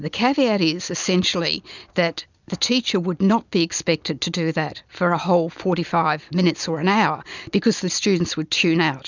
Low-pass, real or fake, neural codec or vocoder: 7.2 kHz; real; none